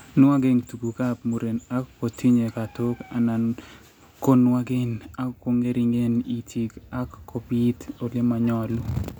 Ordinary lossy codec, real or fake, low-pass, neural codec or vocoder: none; real; none; none